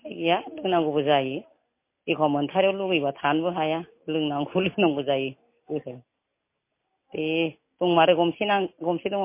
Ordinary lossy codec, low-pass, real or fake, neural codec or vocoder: MP3, 24 kbps; 3.6 kHz; real; none